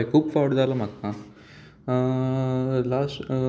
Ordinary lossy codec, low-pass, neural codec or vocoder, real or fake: none; none; none; real